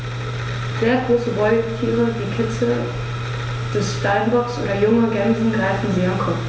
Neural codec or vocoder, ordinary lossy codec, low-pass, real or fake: none; none; none; real